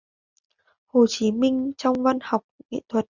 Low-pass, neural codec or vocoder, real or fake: 7.2 kHz; none; real